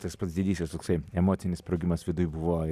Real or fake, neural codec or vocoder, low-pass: real; none; 14.4 kHz